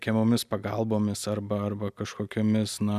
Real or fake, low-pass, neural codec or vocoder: real; 14.4 kHz; none